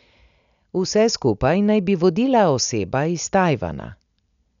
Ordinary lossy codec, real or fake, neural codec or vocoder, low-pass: none; real; none; 7.2 kHz